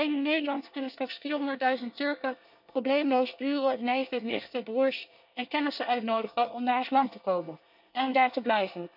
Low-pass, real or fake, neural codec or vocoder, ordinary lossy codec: 5.4 kHz; fake; codec, 24 kHz, 1 kbps, SNAC; none